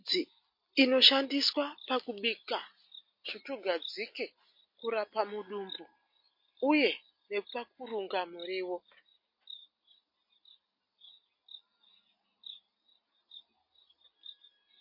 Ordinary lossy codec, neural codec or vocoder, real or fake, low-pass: MP3, 32 kbps; none; real; 5.4 kHz